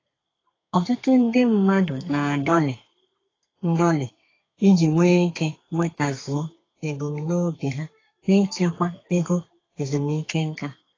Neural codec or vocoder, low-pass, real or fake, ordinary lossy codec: codec, 32 kHz, 1.9 kbps, SNAC; 7.2 kHz; fake; AAC, 32 kbps